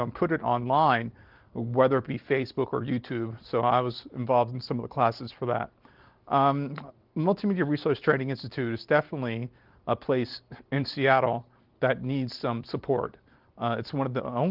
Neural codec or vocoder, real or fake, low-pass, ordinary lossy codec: none; real; 5.4 kHz; Opus, 32 kbps